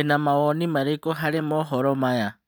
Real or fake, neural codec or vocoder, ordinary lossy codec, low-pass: real; none; none; none